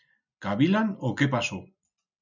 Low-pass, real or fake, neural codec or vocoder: 7.2 kHz; real; none